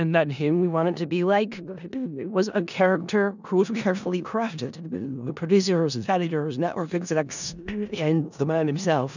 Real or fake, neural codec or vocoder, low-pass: fake; codec, 16 kHz in and 24 kHz out, 0.4 kbps, LongCat-Audio-Codec, four codebook decoder; 7.2 kHz